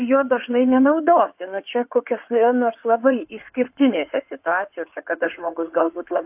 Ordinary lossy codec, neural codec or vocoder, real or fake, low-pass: AAC, 32 kbps; codec, 16 kHz in and 24 kHz out, 2.2 kbps, FireRedTTS-2 codec; fake; 3.6 kHz